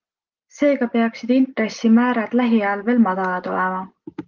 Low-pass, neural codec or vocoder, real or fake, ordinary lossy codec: 7.2 kHz; none; real; Opus, 32 kbps